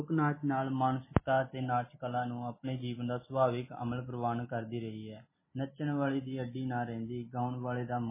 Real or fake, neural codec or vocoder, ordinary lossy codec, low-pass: real; none; MP3, 16 kbps; 3.6 kHz